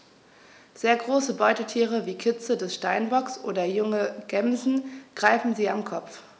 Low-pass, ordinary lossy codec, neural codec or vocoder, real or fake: none; none; none; real